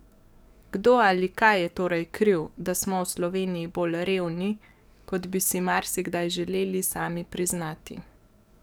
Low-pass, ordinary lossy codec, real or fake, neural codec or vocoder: none; none; fake; codec, 44.1 kHz, 7.8 kbps, DAC